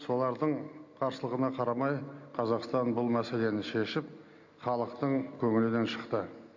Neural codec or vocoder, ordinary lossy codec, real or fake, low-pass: none; MP3, 48 kbps; real; 7.2 kHz